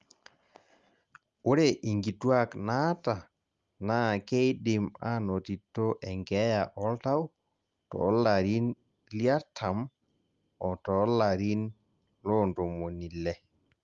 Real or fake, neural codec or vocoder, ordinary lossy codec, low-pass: real; none; Opus, 32 kbps; 7.2 kHz